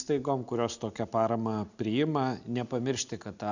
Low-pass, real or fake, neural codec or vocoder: 7.2 kHz; real; none